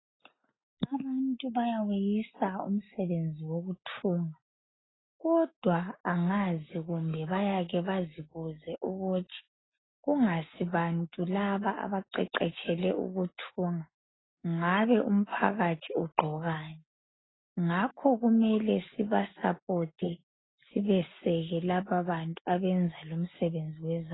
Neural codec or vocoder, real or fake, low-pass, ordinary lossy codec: none; real; 7.2 kHz; AAC, 16 kbps